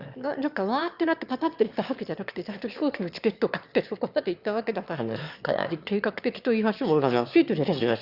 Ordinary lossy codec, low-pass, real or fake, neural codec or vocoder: Opus, 64 kbps; 5.4 kHz; fake; autoencoder, 22.05 kHz, a latent of 192 numbers a frame, VITS, trained on one speaker